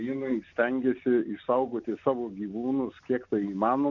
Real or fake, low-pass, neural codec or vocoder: real; 7.2 kHz; none